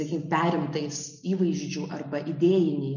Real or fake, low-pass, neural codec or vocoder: real; 7.2 kHz; none